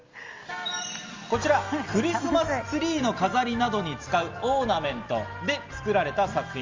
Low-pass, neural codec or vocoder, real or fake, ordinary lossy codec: 7.2 kHz; none; real; Opus, 32 kbps